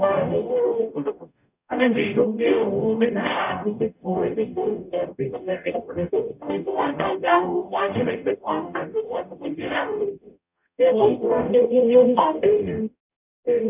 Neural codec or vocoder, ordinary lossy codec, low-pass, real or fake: codec, 44.1 kHz, 0.9 kbps, DAC; none; 3.6 kHz; fake